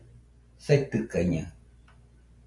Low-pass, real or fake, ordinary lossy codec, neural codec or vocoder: 10.8 kHz; real; MP3, 64 kbps; none